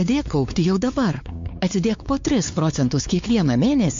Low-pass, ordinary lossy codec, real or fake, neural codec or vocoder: 7.2 kHz; MP3, 48 kbps; fake; codec, 16 kHz, 4 kbps, X-Codec, WavLM features, trained on Multilingual LibriSpeech